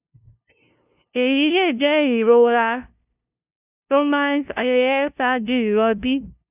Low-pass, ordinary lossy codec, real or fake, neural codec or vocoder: 3.6 kHz; none; fake; codec, 16 kHz, 0.5 kbps, FunCodec, trained on LibriTTS, 25 frames a second